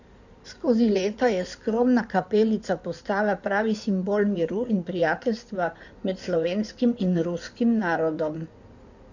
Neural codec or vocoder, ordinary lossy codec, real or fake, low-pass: codec, 16 kHz in and 24 kHz out, 2.2 kbps, FireRedTTS-2 codec; none; fake; 7.2 kHz